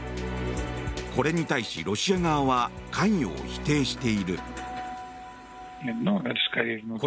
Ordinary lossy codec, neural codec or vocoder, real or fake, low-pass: none; none; real; none